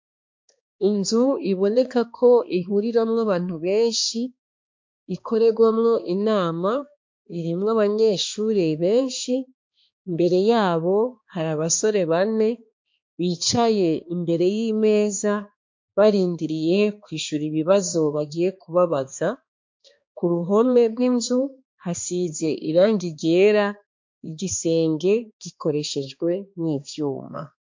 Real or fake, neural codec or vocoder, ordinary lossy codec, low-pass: fake; codec, 16 kHz, 2 kbps, X-Codec, HuBERT features, trained on balanced general audio; MP3, 48 kbps; 7.2 kHz